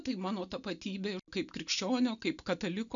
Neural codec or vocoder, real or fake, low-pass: none; real; 7.2 kHz